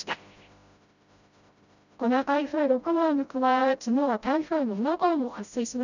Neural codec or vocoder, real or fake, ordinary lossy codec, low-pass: codec, 16 kHz, 0.5 kbps, FreqCodec, smaller model; fake; none; 7.2 kHz